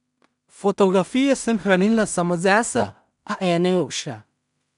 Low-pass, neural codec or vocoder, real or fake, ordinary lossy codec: 10.8 kHz; codec, 16 kHz in and 24 kHz out, 0.4 kbps, LongCat-Audio-Codec, two codebook decoder; fake; none